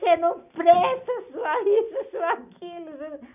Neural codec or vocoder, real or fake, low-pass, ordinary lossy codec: none; real; 3.6 kHz; none